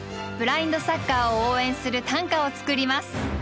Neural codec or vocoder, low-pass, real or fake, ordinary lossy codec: none; none; real; none